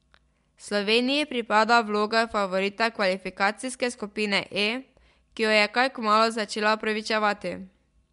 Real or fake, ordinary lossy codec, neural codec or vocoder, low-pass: real; MP3, 64 kbps; none; 10.8 kHz